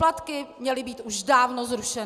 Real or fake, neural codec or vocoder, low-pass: real; none; 14.4 kHz